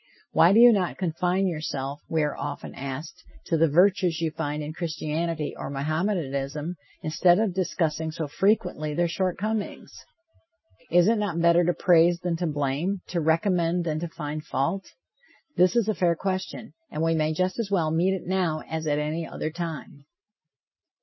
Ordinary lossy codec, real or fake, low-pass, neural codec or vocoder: MP3, 24 kbps; real; 7.2 kHz; none